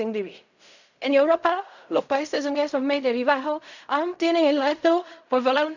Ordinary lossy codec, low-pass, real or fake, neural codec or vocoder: none; 7.2 kHz; fake; codec, 16 kHz in and 24 kHz out, 0.4 kbps, LongCat-Audio-Codec, fine tuned four codebook decoder